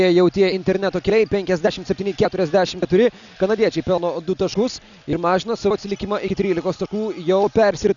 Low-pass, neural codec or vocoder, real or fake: 7.2 kHz; none; real